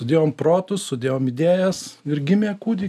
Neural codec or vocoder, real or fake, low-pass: none; real; 14.4 kHz